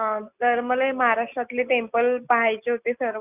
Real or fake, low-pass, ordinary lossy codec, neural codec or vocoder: real; 3.6 kHz; none; none